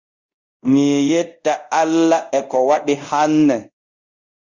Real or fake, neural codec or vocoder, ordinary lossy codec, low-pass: fake; codec, 24 kHz, 0.5 kbps, DualCodec; Opus, 64 kbps; 7.2 kHz